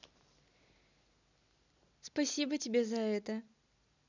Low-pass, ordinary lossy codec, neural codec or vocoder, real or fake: 7.2 kHz; none; none; real